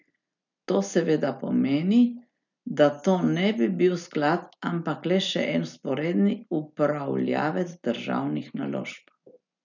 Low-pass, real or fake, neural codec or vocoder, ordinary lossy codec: 7.2 kHz; real; none; none